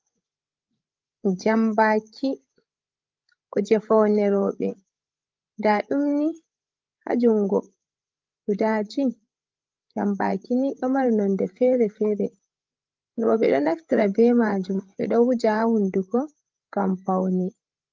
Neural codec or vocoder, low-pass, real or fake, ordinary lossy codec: codec, 16 kHz, 8 kbps, FreqCodec, larger model; 7.2 kHz; fake; Opus, 32 kbps